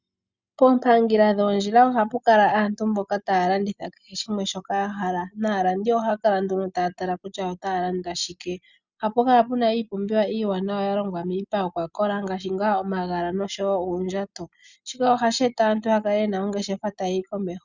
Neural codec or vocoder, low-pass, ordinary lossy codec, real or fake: none; 7.2 kHz; Opus, 64 kbps; real